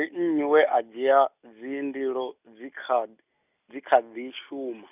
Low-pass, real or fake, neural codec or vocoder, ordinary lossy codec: 3.6 kHz; real; none; none